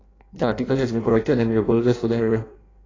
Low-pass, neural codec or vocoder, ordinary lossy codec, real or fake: 7.2 kHz; codec, 16 kHz in and 24 kHz out, 0.6 kbps, FireRedTTS-2 codec; AAC, 32 kbps; fake